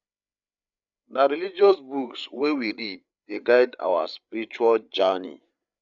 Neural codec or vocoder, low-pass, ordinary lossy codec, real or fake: codec, 16 kHz, 8 kbps, FreqCodec, larger model; 7.2 kHz; none; fake